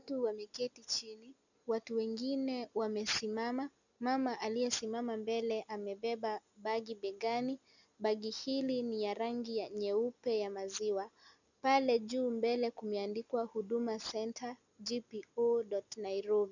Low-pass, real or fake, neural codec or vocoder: 7.2 kHz; real; none